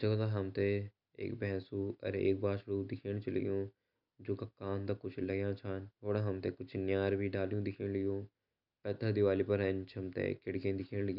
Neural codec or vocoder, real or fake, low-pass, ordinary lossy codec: none; real; 5.4 kHz; none